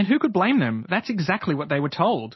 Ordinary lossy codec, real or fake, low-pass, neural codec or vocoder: MP3, 24 kbps; real; 7.2 kHz; none